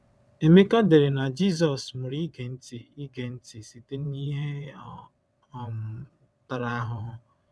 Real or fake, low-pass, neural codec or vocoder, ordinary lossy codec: fake; none; vocoder, 22.05 kHz, 80 mel bands, WaveNeXt; none